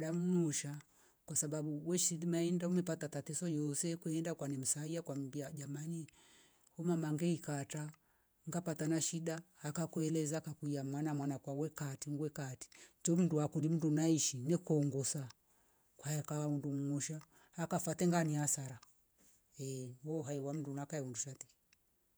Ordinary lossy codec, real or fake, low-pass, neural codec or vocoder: none; fake; none; vocoder, 48 kHz, 128 mel bands, Vocos